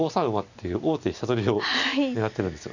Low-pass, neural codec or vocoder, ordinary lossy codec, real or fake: 7.2 kHz; none; none; real